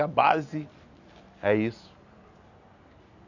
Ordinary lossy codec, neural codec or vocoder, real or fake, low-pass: none; none; real; 7.2 kHz